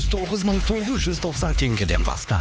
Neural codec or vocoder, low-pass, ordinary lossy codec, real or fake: codec, 16 kHz, 2 kbps, X-Codec, HuBERT features, trained on LibriSpeech; none; none; fake